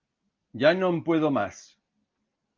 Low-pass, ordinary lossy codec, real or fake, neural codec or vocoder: 7.2 kHz; Opus, 32 kbps; real; none